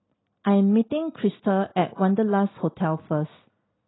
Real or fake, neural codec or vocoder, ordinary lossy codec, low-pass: real; none; AAC, 16 kbps; 7.2 kHz